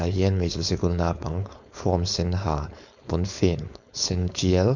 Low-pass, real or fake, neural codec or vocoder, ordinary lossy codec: 7.2 kHz; fake; codec, 16 kHz, 4.8 kbps, FACodec; none